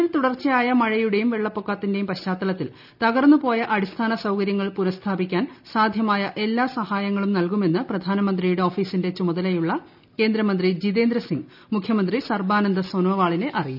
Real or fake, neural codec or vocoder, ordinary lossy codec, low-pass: real; none; none; 5.4 kHz